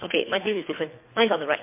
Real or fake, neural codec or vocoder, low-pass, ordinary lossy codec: fake; codec, 24 kHz, 3 kbps, HILCodec; 3.6 kHz; MP3, 24 kbps